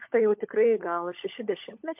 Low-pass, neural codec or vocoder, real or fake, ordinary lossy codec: 3.6 kHz; codec, 16 kHz, 16 kbps, FunCodec, trained on LibriTTS, 50 frames a second; fake; MP3, 32 kbps